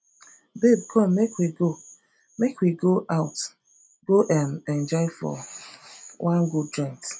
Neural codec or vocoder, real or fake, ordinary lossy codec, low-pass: none; real; none; none